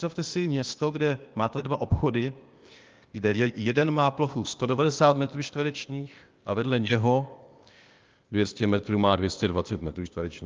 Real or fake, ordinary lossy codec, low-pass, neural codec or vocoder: fake; Opus, 24 kbps; 7.2 kHz; codec, 16 kHz, 0.8 kbps, ZipCodec